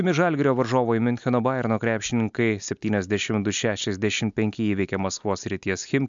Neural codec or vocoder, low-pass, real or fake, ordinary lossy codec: none; 7.2 kHz; real; MP3, 64 kbps